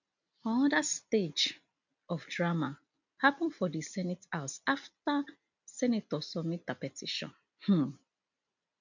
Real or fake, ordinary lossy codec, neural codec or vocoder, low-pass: real; none; none; 7.2 kHz